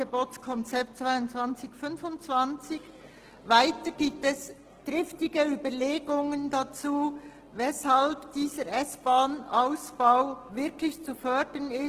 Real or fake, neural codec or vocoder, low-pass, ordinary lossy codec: real; none; 14.4 kHz; Opus, 16 kbps